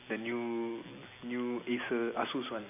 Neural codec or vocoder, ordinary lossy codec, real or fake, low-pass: none; MP3, 24 kbps; real; 3.6 kHz